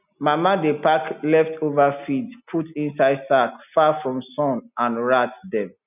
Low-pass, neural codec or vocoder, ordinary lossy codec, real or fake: 3.6 kHz; none; none; real